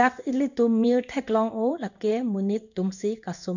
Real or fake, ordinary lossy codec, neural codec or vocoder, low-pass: fake; none; codec, 16 kHz in and 24 kHz out, 1 kbps, XY-Tokenizer; 7.2 kHz